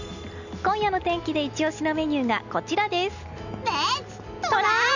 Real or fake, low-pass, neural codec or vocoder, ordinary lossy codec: real; 7.2 kHz; none; none